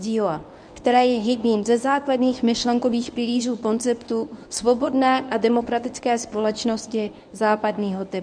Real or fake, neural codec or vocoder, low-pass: fake; codec, 24 kHz, 0.9 kbps, WavTokenizer, medium speech release version 1; 9.9 kHz